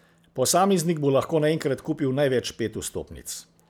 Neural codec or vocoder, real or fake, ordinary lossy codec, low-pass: none; real; none; none